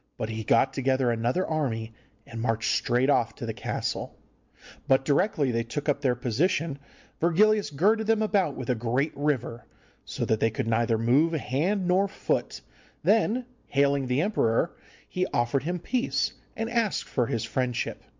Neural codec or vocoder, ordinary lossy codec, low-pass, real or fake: none; MP3, 64 kbps; 7.2 kHz; real